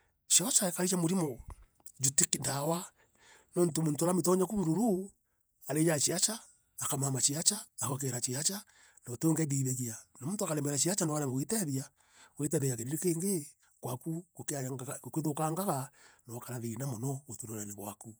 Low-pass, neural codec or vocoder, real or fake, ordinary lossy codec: none; vocoder, 48 kHz, 128 mel bands, Vocos; fake; none